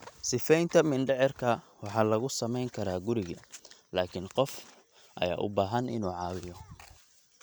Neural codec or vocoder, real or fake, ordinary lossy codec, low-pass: none; real; none; none